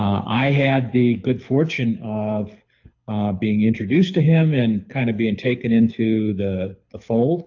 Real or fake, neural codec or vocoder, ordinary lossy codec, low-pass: fake; codec, 24 kHz, 6 kbps, HILCodec; AAC, 48 kbps; 7.2 kHz